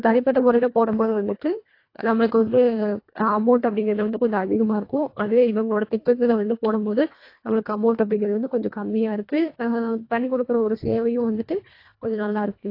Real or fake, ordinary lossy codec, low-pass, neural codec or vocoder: fake; AAC, 32 kbps; 5.4 kHz; codec, 24 kHz, 1.5 kbps, HILCodec